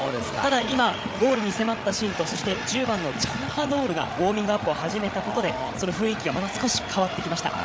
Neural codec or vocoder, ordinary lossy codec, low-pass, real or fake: codec, 16 kHz, 8 kbps, FreqCodec, larger model; none; none; fake